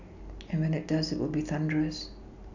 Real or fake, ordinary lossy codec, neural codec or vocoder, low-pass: real; none; none; 7.2 kHz